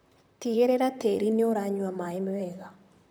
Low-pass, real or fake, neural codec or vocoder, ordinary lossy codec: none; fake; vocoder, 44.1 kHz, 128 mel bands, Pupu-Vocoder; none